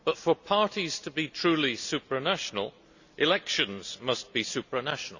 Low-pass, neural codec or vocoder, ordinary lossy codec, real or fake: 7.2 kHz; none; none; real